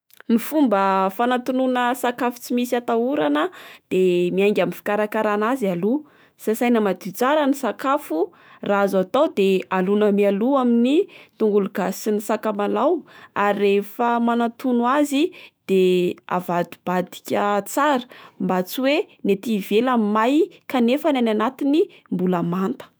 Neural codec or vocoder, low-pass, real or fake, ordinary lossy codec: autoencoder, 48 kHz, 128 numbers a frame, DAC-VAE, trained on Japanese speech; none; fake; none